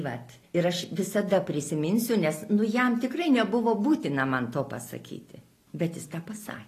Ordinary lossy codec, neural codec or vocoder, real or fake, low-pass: AAC, 48 kbps; none; real; 14.4 kHz